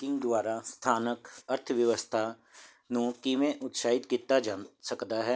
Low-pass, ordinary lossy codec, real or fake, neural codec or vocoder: none; none; real; none